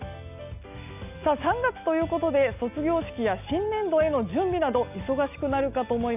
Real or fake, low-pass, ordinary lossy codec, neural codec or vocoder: real; 3.6 kHz; none; none